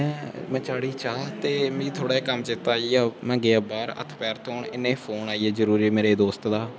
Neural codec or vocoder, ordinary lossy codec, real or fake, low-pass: none; none; real; none